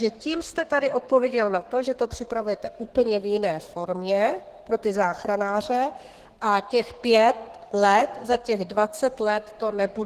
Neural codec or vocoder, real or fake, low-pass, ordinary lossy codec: codec, 32 kHz, 1.9 kbps, SNAC; fake; 14.4 kHz; Opus, 16 kbps